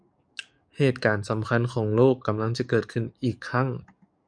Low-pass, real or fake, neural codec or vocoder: 9.9 kHz; fake; codec, 44.1 kHz, 7.8 kbps, DAC